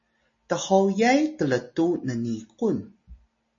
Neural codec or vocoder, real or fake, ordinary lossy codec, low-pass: none; real; MP3, 32 kbps; 7.2 kHz